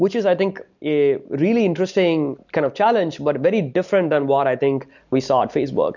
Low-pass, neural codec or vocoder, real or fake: 7.2 kHz; none; real